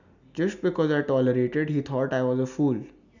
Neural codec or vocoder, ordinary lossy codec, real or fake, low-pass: none; none; real; 7.2 kHz